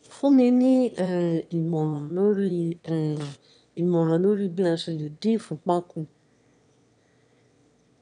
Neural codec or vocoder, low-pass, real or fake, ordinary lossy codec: autoencoder, 22.05 kHz, a latent of 192 numbers a frame, VITS, trained on one speaker; 9.9 kHz; fake; none